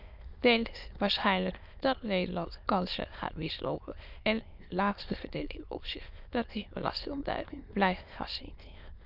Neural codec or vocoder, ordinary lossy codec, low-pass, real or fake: autoencoder, 22.05 kHz, a latent of 192 numbers a frame, VITS, trained on many speakers; none; 5.4 kHz; fake